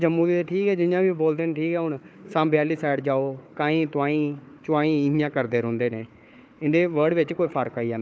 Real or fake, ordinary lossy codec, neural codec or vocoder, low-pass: fake; none; codec, 16 kHz, 4 kbps, FunCodec, trained on Chinese and English, 50 frames a second; none